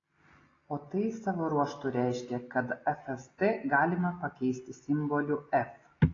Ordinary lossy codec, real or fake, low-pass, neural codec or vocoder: AAC, 32 kbps; real; 7.2 kHz; none